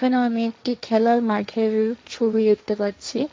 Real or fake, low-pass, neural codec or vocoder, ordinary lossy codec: fake; none; codec, 16 kHz, 1.1 kbps, Voila-Tokenizer; none